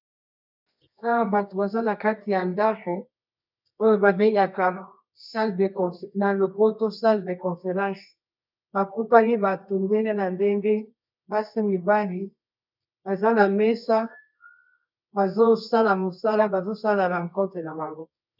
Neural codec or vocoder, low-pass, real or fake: codec, 24 kHz, 0.9 kbps, WavTokenizer, medium music audio release; 5.4 kHz; fake